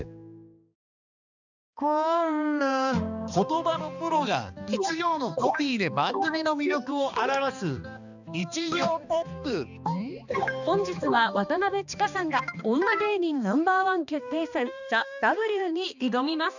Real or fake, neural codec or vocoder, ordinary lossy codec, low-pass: fake; codec, 16 kHz, 2 kbps, X-Codec, HuBERT features, trained on balanced general audio; none; 7.2 kHz